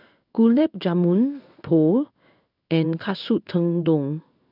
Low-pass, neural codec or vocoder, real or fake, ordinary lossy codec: 5.4 kHz; codec, 16 kHz in and 24 kHz out, 1 kbps, XY-Tokenizer; fake; none